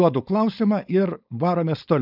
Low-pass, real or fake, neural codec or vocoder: 5.4 kHz; fake; codec, 16 kHz, 4.8 kbps, FACodec